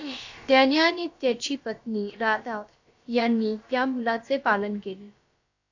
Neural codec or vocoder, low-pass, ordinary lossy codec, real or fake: codec, 16 kHz, about 1 kbps, DyCAST, with the encoder's durations; 7.2 kHz; AAC, 48 kbps; fake